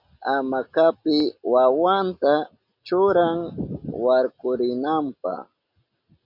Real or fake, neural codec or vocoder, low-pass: real; none; 5.4 kHz